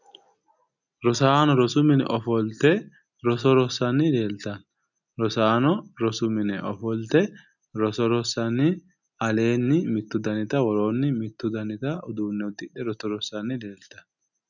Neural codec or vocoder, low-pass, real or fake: none; 7.2 kHz; real